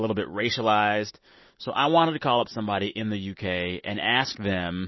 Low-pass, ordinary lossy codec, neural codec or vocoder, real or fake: 7.2 kHz; MP3, 24 kbps; none; real